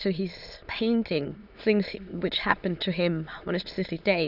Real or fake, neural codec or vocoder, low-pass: fake; autoencoder, 22.05 kHz, a latent of 192 numbers a frame, VITS, trained on many speakers; 5.4 kHz